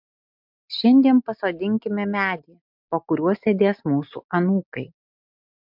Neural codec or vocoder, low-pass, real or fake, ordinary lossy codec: none; 5.4 kHz; real; MP3, 48 kbps